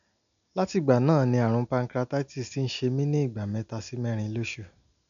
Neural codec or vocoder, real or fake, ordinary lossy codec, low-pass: none; real; none; 7.2 kHz